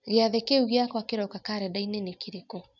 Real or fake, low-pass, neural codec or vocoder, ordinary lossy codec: real; 7.2 kHz; none; none